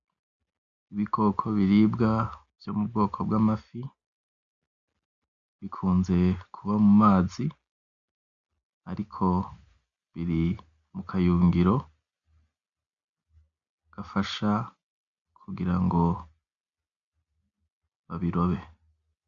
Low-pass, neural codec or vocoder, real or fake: 7.2 kHz; none; real